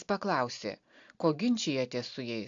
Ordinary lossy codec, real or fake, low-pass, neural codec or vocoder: AAC, 64 kbps; real; 7.2 kHz; none